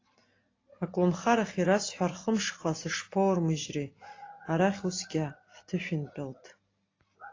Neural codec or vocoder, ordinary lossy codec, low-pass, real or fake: none; AAC, 32 kbps; 7.2 kHz; real